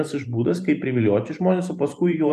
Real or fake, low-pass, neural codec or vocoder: fake; 14.4 kHz; vocoder, 44.1 kHz, 128 mel bands every 512 samples, BigVGAN v2